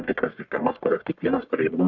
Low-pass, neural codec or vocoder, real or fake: 7.2 kHz; codec, 44.1 kHz, 1.7 kbps, Pupu-Codec; fake